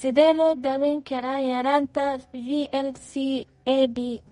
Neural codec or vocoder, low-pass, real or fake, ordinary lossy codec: codec, 24 kHz, 0.9 kbps, WavTokenizer, medium music audio release; 10.8 kHz; fake; MP3, 48 kbps